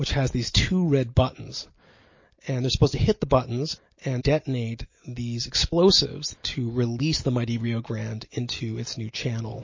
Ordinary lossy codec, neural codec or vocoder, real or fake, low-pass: MP3, 32 kbps; none; real; 7.2 kHz